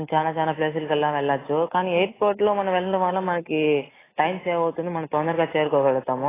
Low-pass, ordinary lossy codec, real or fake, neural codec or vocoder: 3.6 kHz; AAC, 16 kbps; real; none